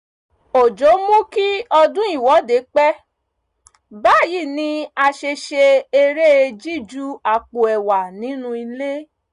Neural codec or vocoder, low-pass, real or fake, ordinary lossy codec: none; 10.8 kHz; real; none